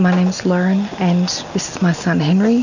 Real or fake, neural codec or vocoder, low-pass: real; none; 7.2 kHz